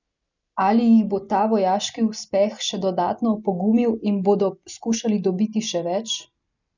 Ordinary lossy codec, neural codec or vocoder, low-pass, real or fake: none; none; 7.2 kHz; real